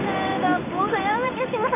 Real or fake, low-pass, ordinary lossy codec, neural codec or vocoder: fake; 3.6 kHz; none; codec, 16 kHz in and 24 kHz out, 1 kbps, XY-Tokenizer